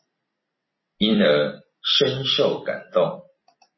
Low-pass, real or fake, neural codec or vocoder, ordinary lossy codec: 7.2 kHz; fake; vocoder, 44.1 kHz, 128 mel bands every 256 samples, BigVGAN v2; MP3, 24 kbps